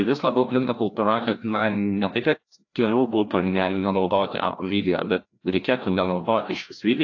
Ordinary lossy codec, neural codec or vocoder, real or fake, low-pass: AAC, 48 kbps; codec, 16 kHz, 1 kbps, FreqCodec, larger model; fake; 7.2 kHz